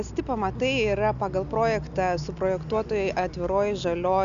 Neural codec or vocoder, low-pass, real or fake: none; 7.2 kHz; real